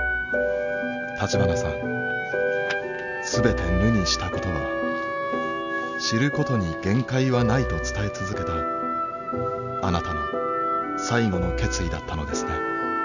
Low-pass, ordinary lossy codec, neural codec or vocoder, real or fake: 7.2 kHz; none; none; real